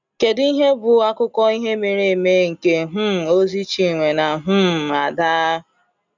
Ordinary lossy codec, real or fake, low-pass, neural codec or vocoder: none; real; 7.2 kHz; none